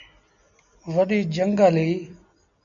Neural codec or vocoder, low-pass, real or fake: none; 7.2 kHz; real